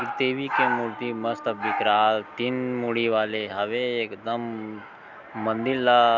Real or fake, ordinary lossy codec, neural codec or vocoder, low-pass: real; none; none; 7.2 kHz